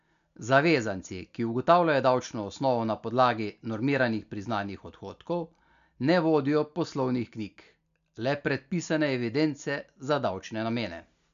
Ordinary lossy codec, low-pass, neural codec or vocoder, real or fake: MP3, 96 kbps; 7.2 kHz; none; real